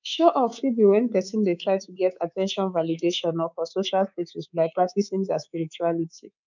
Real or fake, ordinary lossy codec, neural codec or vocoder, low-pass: fake; none; codec, 24 kHz, 3.1 kbps, DualCodec; 7.2 kHz